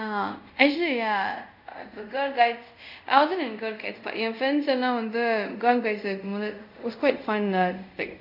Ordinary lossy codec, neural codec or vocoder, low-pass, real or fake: none; codec, 24 kHz, 0.5 kbps, DualCodec; 5.4 kHz; fake